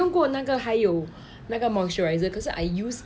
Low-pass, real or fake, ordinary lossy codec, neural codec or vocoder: none; real; none; none